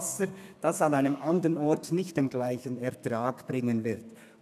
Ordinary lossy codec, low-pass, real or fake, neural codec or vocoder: none; 14.4 kHz; fake; codec, 32 kHz, 1.9 kbps, SNAC